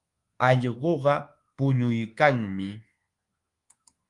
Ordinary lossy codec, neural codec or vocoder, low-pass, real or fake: Opus, 24 kbps; codec, 24 kHz, 1.2 kbps, DualCodec; 10.8 kHz; fake